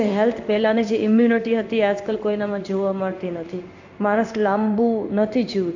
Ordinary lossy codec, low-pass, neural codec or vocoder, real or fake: MP3, 64 kbps; 7.2 kHz; codec, 16 kHz in and 24 kHz out, 1 kbps, XY-Tokenizer; fake